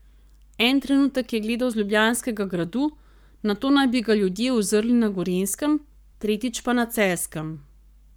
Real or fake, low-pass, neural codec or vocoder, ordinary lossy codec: fake; none; codec, 44.1 kHz, 7.8 kbps, Pupu-Codec; none